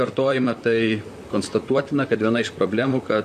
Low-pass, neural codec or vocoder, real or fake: 14.4 kHz; vocoder, 44.1 kHz, 128 mel bands, Pupu-Vocoder; fake